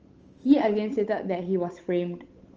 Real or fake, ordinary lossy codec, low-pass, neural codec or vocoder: fake; Opus, 24 kbps; 7.2 kHz; codec, 16 kHz, 8 kbps, FunCodec, trained on Chinese and English, 25 frames a second